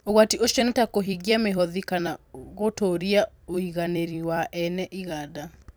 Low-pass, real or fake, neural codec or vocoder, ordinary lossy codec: none; fake; vocoder, 44.1 kHz, 128 mel bands every 256 samples, BigVGAN v2; none